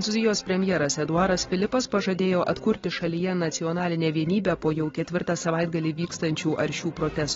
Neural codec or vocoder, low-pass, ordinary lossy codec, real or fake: none; 7.2 kHz; AAC, 24 kbps; real